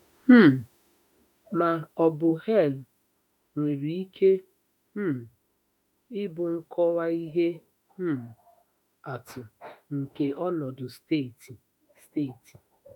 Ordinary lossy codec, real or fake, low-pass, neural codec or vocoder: none; fake; 19.8 kHz; autoencoder, 48 kHz, 32 numbers a frame, DAC-VAE, trained on Japanese speech